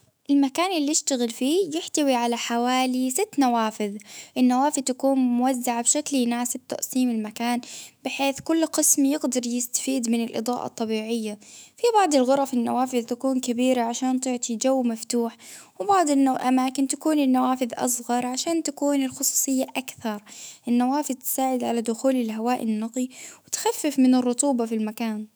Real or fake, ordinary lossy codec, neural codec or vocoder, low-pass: fake; none; autoencoder, 48 kHz, 128 numbers a frame, DAC-VAE, trained on Japanese speech; none